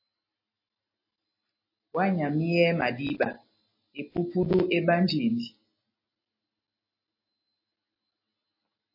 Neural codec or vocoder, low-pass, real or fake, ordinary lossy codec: none; 5.4 kHz; real; MP3, 24 kbps